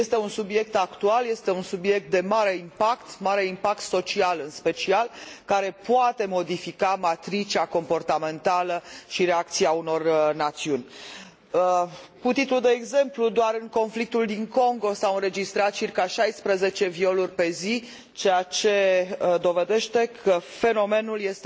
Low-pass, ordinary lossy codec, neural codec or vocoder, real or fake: none; none; none; real